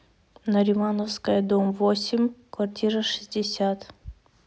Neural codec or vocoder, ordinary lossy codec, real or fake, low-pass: none; none; real; none